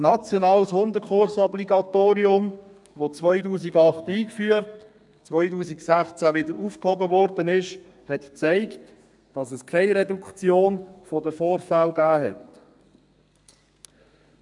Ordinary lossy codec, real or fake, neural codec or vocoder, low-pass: MP3, 96 kbps; fake; codec, 32 kHz, 1.9 kbps, SNAC; 10.8 kHz